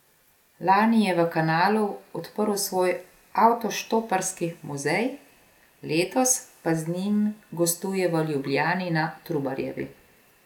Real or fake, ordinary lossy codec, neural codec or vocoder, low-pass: real; none; none; 19.8 kHz